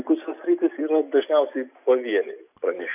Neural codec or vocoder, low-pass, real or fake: none; 3.6 kHz; real